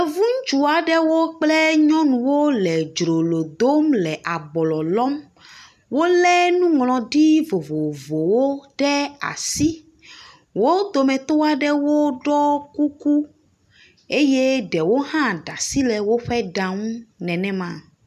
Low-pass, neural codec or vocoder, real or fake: 14.4 kHz; none; real